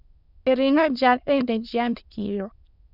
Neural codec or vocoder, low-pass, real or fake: autoencoder, 22.05 kHz, a latent of 192 numbers a frame, VITS, trained on many speakers; 5.4 kHz; fake